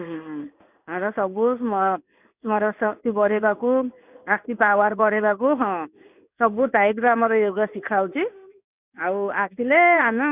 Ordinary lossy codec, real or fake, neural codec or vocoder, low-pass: none; fake; codec, 16 kHz in and 24 kHz out, 1 kbps, XY-Tokenizer; 3.6 kHz